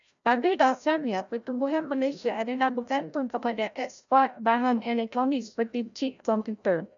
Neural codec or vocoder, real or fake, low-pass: codec, 16 kHz, 0.5 kbps, FreqCodec, larger model; fake; 7.2 kHz